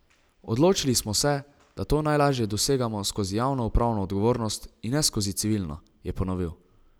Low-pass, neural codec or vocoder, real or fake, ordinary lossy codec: none; none; real; none